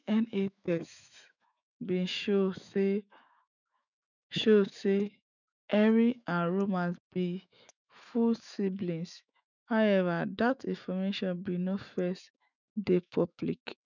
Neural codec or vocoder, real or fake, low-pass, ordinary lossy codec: autoencoder, 48 kHz, 128 numbers a frame, DAC-VAE, trained on Japanese speech; fake; 7.2 kHz; none